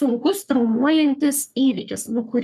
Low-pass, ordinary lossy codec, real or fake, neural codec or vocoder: 14.4 kHz; MP3, 96 kbps; fake; codec, 44.1 kHz, 3.4 kbps, Pupu-Codec